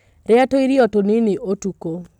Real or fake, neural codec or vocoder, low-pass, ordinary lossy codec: real; none; 19.8 kHz; none